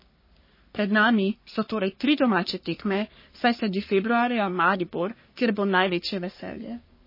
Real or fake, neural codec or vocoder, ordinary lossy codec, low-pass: fake; codec, 44.1 kHz, 3.4 kbps, Pupu-Codec; MP3, 24 kbps; 5.4 kHz